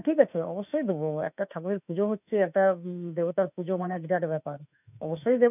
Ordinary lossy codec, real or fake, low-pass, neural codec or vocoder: none; fake; 3.6 kHz; autoencoder, 48 kHz, 32 numbers a frame, DAC-VAE, trained on Japanese speech